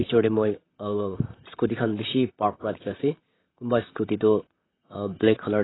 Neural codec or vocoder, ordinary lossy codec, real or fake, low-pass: vocoder, 44.1 kHz, 128 mel bands, Pupu-Vocoder; AAC, 16 kbps; fake; 7.2 kHz